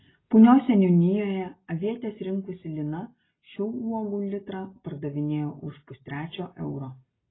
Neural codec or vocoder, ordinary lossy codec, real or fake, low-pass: none; AAC, 16 kbps; real; 7.2 kHz